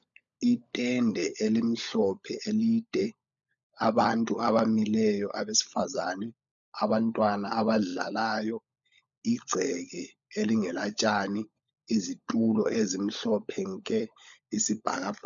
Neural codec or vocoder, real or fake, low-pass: codec, 16 kHz, 16 kbps, FunCodec, trained on LibriTTS, 50 frames a second; fake; 7.2 kHz